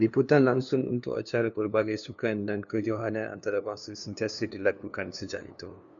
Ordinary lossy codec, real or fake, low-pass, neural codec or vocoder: MP3, 96 kbps; fake; 7.2 kHz; codec, 16 kHz, 2 kbps, FunCodec, trained on LibriTTS, 25 frames a second